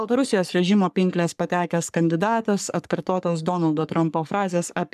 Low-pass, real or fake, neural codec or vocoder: 14.4 kHz; fake; codec, 44.1 kHz, 3.4 kbps, Pupu-Codec